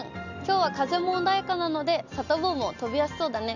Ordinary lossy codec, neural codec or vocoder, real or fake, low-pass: none; none; real; 7.2 kHz